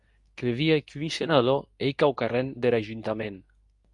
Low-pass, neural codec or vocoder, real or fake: 10.8 kHz; codec, 24 kHz, 0.9 kbps, WavTokenizer, medium speech release version 2; fake